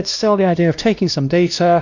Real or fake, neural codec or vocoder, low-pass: fake; codec, 16 kHz, 1 kbps, X-Codec, WavLM features, trained on Multilingual LibriSpeech; 7.2 kHz